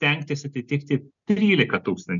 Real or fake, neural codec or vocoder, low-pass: real; none; 7.2 kHz